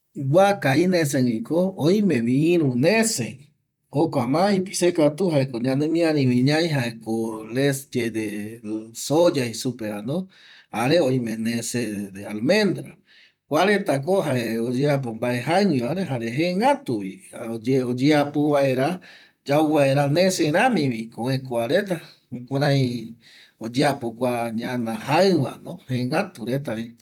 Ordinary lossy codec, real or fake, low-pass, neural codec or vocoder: none; fake; 19.8 kHz; vocoder, 44.1 kHz, 128 mel bands, Pupu-Vocoder